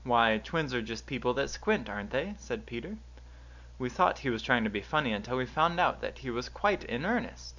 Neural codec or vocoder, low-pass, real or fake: none; 7.2 kHz; real